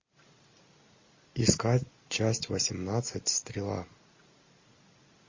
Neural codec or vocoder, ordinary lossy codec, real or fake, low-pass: none; MP3, 32 kbps; real; 7.2 kHz